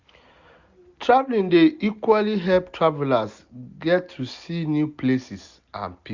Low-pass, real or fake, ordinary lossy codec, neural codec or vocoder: 7.2 kHz; real; Opus, 64 kbps; none